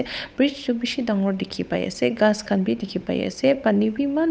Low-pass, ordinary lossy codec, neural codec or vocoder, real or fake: none; none; codec, 16 kHz, 8 kbps, FunCodec, trained on Chinese and English, 25 frames a second; fake